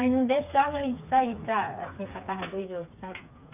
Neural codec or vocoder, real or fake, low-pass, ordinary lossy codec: codec, 16 kHz, 4 kbps, FreqCodec, smaller model; fake; 3.6 kHz; none